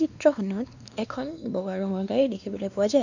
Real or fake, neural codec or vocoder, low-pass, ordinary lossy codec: fake; codec, 16 kHz, 2 kbps, X-Codec, WavLM features, trained on Multilingual LibriSpeech; 7.2 kHz; none